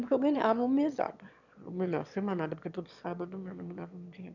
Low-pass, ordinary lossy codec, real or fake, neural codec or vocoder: 7.2 kHz; none; fake; autoencoder, 22.05 kHz, a latent of 192 numbers a frame, VITS, trained on one speaker